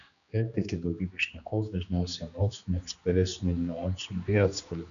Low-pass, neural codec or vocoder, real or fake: 7.2 kHz; codec, 16 kHz, 2 kbps, X-Codec, HuBERT features, trained on general audio; fake